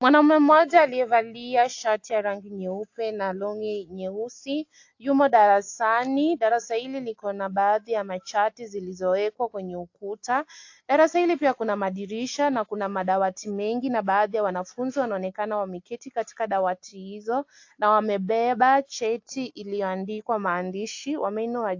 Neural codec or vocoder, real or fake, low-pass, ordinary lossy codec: none; real; 7.2 kHz; AAC, 48 kbps